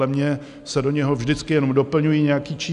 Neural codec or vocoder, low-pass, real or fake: none; 10.8 kHz; real